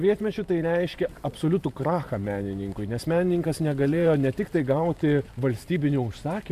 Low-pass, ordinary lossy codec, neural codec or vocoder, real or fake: 14.4 kHz; AAC, 96 kbps; vocoder, 44.1 kHz, 128 mel bands every 512 samples, BigVGAN v2; fake